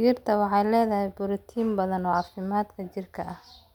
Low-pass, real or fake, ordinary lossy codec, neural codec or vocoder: 19.8 kHz; real; none; none